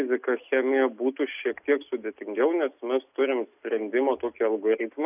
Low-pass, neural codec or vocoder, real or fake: 3.6 kHz; none; real